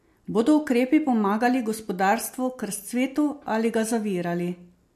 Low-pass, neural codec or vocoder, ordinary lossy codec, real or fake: 14.4 kHz; none; MP3, 64 kbps; real